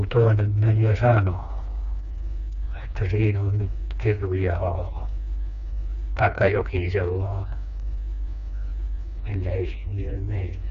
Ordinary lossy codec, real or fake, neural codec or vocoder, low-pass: none; fake; codec, 16 kHz, 2 kbps, FreqCodec, smaller model; 7.2 kHz